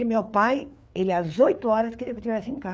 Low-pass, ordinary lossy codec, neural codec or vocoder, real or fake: none; none; codec, 16 kHz, 4 kbps, FreqCodec, larger model; fake